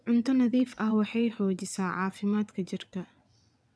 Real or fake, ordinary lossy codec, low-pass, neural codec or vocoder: fake; none; none; vocoder, 22.05 kHz, 80 mel bands, WaveNeXt